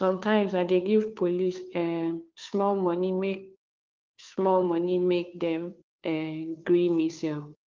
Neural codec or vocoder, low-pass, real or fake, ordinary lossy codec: codec, 16 kHz, 2 kbps, FunCodec, trained on Chinese and English, 25 frames a second; 7.2 kHz; fake; Opus, 32 kbps